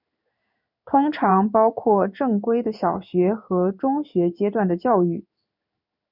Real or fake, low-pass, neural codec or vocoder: fake; 5.4 kHz; codec, 16 kHz in and 24 kHz out, 1 kbps, XY-Tokenizer